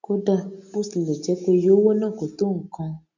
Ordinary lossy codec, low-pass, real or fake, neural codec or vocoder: none; 7.2 kHz; real; none